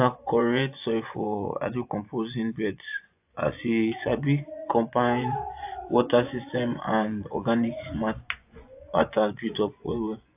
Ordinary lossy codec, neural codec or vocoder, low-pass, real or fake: AAC, 32 kbps; vocoder, 24 kHz, 100 mel bands, Vocos; 3.6 kHz; fake